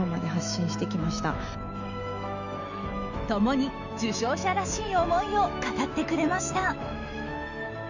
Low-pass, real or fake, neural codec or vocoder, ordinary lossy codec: 7.2 kHz; fake; autoencoder, 48 kHz, 128 numbers a frame, DAC-VAE, trained on Japanese speech; none